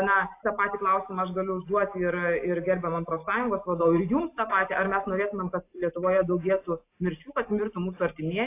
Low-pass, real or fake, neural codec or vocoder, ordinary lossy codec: 3.6 kHz; real; none; AAC, 24 kbps